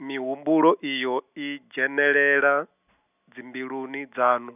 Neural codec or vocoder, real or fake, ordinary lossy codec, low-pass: none; real; none; 3.6 kHz